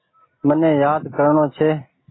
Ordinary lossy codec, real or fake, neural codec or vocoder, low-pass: AAC, 16 kbps; real; none; 7.2 kHz